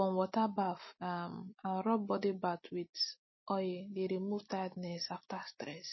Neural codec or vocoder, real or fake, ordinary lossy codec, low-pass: none; real; MP3, 24 kbps; 7.2 kHz